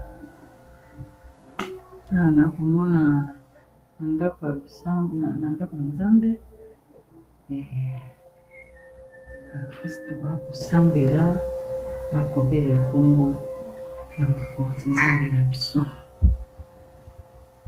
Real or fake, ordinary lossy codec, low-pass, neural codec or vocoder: fake; Opus, 32 kbps; 14.4 kHz; codec, 32 kHz, 1.9 kbps, SNAC